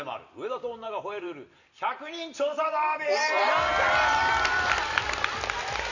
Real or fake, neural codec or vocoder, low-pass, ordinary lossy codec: fake; vocoder, 44.1 kHz, 128 mel bands every 256 samples, BigVGAN v2; 7.2 kHz; none